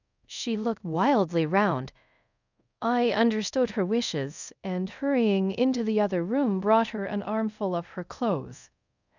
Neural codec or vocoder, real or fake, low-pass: codec, 24 kHz, 0.5 kbps, DualCodec; fake; 7.2 kHz